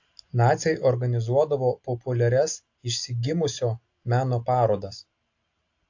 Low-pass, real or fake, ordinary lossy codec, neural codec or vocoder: 7.2 kHz; real; AAC, 48 kbps; none